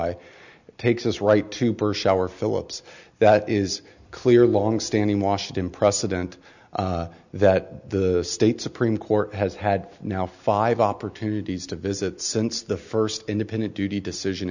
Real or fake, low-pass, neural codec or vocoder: real; 7.2 kHz; none